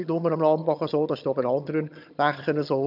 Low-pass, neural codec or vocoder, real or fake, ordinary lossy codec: 5.4 kHz; vocoder, 22.05 kHz, 80 mel bands, HiFi-GAN; fake; none